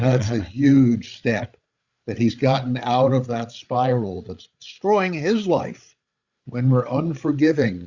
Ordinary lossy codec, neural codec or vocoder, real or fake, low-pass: Opus, 64 kbps; codec, 16 kHz, 8 kbps, FreqCodec, larger model; fake; 7.2 kHz